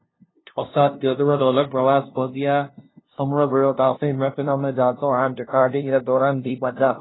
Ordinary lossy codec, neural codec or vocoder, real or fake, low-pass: AAC, 16 kbps; codec, 16 kHz, 0.5 kbps, FunCodec, trained on LibriTTS, 25 frames a second; fake; 7.2 kHz